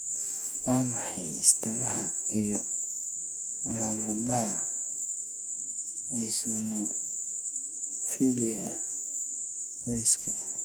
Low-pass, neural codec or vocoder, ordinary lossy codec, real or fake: none; codec, 44.1 kHz, 2.6 kbps, DAC; none; fake